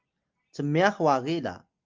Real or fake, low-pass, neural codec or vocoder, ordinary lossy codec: real; 7.2 kHz; none; Opus, 24 kbps